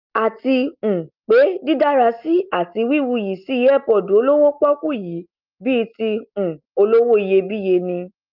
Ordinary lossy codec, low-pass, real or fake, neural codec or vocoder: Opus, 24 kbps; 5.4 kHz; real; none